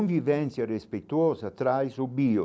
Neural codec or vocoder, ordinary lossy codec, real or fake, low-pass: none; none; real; none